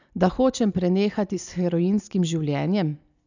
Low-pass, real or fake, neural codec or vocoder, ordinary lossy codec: 7.2 kHz; real; none; none